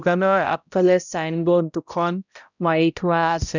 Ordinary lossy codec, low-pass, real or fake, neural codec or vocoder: none; 7.2 kHz; fake; codec, 16 kHz, 0.5 kbps, X-Codec, HuBERT features, trained on balanced general audio